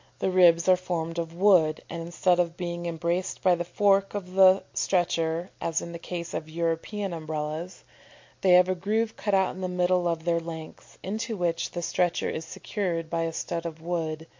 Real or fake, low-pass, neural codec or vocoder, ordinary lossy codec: real; 7.2 kHz; none; MP3, 48 kbps